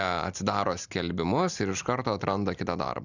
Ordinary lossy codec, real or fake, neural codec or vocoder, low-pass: Opus, 64 kbps; real; none; 7.2 kHz